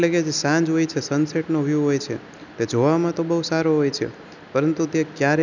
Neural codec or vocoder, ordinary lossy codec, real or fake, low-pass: none; none; real; 7.2 kHz